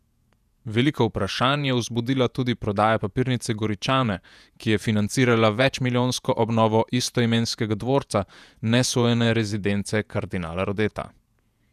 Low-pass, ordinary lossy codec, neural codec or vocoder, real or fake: 14.4 kHz; none; vocoder, 48 kHz, 128 mel bands, Vocos; fake